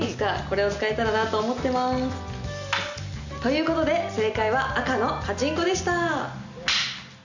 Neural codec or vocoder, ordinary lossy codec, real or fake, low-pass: none; none; real; 7.2 kHz